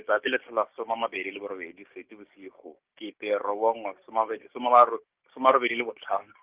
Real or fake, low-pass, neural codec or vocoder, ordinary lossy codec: fake; 3.6 kHz; codec, 16 kHz, 6 kbps, DAC; Opus, 32 kbps